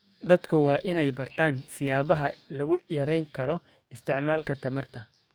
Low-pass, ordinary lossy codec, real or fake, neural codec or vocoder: none; none; fake; codec, 44.1 kHz, 2.6 kbps, DAC